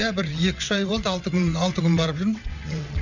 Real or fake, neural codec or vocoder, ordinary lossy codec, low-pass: fake; vocoder, 22.05 kHz, 80 mel bands, WaveNeXt; none; 7.2 kHz